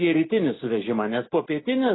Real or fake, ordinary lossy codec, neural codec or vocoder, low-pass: real; AAC, 16 kbps; none; 7.2 kHz